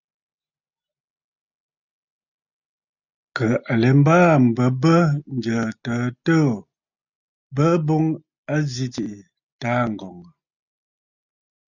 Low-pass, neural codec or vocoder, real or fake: 7.2 kHz; none; real